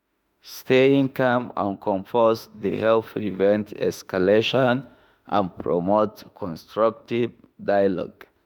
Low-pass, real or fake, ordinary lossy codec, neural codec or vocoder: none; fake; none; autoencoder, 48 kHz, 32 numbers a frame, DAC-VAE, trained on Japanese speech